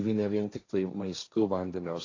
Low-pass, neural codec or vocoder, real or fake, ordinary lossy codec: 7.2 kHz; codec, 16 kHz, 1.1 kbps, Voila-Tokenizer; fake; AAC, 32 kbps